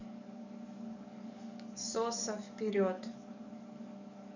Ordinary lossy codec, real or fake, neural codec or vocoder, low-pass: none; fake; codec, 44.1 kHz, 7.8 kbps, DAC; 7.2 kHz